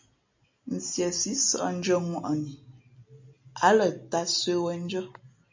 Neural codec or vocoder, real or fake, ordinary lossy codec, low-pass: none; real; MP3, 48 kbps; 7.2 kHz